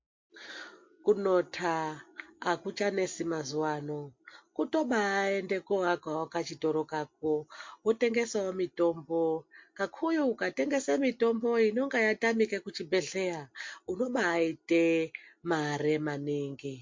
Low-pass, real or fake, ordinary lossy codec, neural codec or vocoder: 7.2 kHz; real; MP3, 48 kbps; none